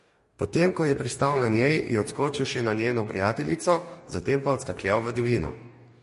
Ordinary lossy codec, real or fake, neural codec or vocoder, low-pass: MP3, 48 kbps; fake; codec, 44.1 kHz, 2.6 kbps, DAC; 14.4 kHz